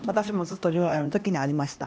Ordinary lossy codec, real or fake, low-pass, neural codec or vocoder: none; fake; none; codec, 16 kHz, 1 kbps, X-Codec, HuBERT features, trained on LibriSpeech